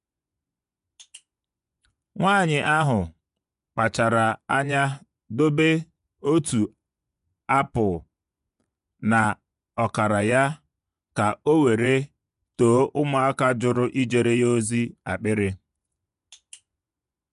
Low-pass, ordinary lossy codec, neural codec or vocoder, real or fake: 10.8 kHz; none; vocoder, 24 kHz, 100 mel bands, Vocos; fake